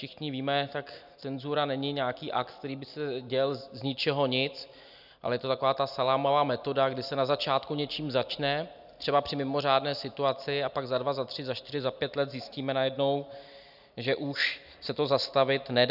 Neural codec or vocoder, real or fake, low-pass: none; real; 5.4 kHz